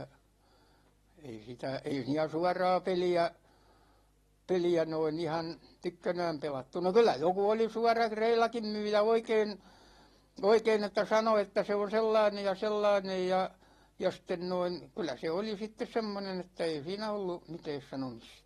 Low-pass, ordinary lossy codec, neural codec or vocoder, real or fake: 19.8 kHz; AAC, 32 kbps; none; real